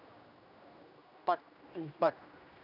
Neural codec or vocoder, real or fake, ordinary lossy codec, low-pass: codec, 16 kHz, 1 kbps, X-Codec, HuBERT features, trained on general audio; fake; none; 5.4 kHz